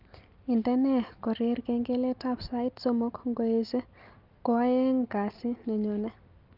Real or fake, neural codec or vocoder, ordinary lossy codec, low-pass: real; none; Opus, 24 kbps; 5.4 kHz